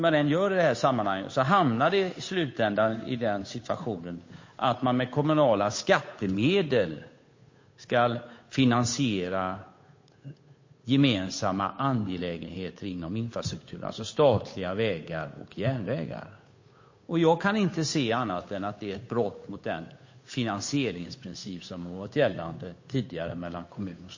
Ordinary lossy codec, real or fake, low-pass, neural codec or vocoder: MP3, 32 kbps; fake; 7.2 kHz; codec, 16 kHz, 8 kbps, FunCodec, trained on Chinese and English, 25 frames a second